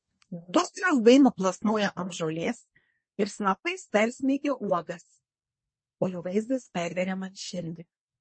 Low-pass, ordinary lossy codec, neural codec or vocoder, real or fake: 9.9 kHz; MP3, 32 kbps; codec, 24 kHz, 1 kbps, SNAC; fake